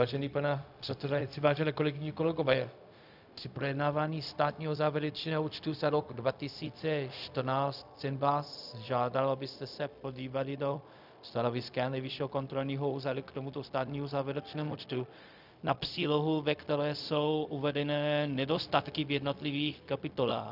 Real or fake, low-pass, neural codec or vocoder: fake; 5.4 kHz; codec, 16 kHz, 0.4 kbps, LongCat-Audio-Codec